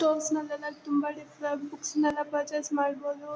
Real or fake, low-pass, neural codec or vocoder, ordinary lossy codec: real; none; none; none